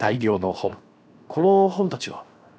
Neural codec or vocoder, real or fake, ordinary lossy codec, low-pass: codec, 16 kHz, 0.7 kbps, FocalCodec; fake; none; none